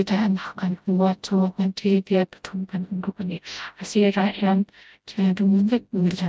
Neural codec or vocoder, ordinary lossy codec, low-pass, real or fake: codec, 16 kHz, 0.5 kbps, FreqCodec, smaller model; none; none; fake